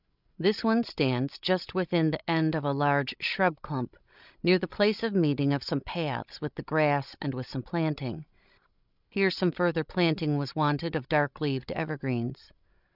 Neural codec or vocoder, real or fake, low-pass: codec, 16 kHz, 16 kbps, FreqCodec, larger model; fake; 5.4 kHz